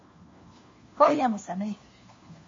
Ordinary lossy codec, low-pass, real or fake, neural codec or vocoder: MP3, 32 kbps; 7.2 kHz; fake; codec, 16 kHz, 1 kbps, FunCodec, trained on LibriTTS, 50 frames a second